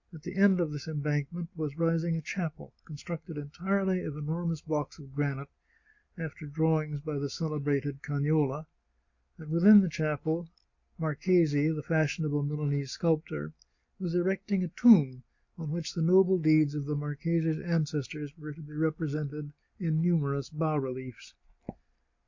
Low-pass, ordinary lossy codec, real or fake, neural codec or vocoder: 7.2 kHz; MP3, 48 kbps; real; none